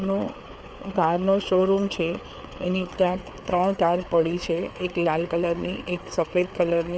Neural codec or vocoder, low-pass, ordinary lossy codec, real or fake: codec, 16 kHz, 4 kbps, FreqCodec, larger model; none; none; fake